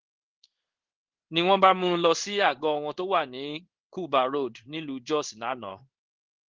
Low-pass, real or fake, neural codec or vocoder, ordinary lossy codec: 7.2 kHz; fake; codec, 16 kHz in and 24 kHz out, 1 kbps, XY-Tokenizer; Opus, 16 kbps